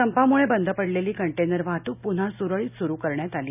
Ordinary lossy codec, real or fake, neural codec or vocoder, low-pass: none; real; none; 3.6 kHz